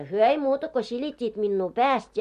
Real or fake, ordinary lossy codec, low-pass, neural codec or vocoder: real; MP3, 64 kbps; 14.4 kHz; none